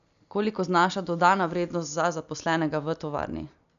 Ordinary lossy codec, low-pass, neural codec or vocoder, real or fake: none; 7.2 kHz; none; real